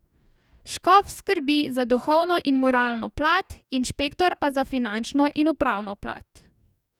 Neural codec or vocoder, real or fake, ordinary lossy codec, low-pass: codec, 44.1 kHz, 2.6 kbps, DAC; fake; none; 19.8 kHz